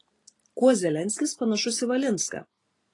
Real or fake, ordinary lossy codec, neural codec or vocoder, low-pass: real; AAC, 32 kbps; none; 10.8 kHz